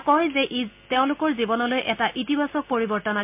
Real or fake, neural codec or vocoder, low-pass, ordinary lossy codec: real; none; 3.6 kHz; MP3, 32 kbps